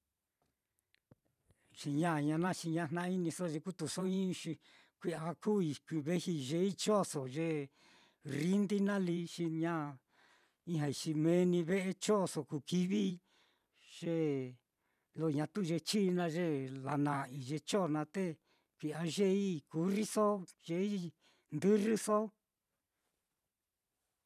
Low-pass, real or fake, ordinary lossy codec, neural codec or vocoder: 14.4 kHz; fake; none; vocoder, 44.1 kHz, 128 mel bands every 512 samples, BigVGAN v2